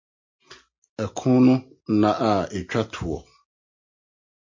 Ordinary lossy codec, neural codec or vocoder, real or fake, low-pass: MP3, 32 kbps; none; real; 7.2 kHz